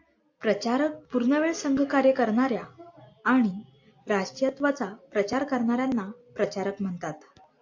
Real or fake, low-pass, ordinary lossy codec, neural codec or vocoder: real; 7.2 kHz; AAC, 48 kbps; none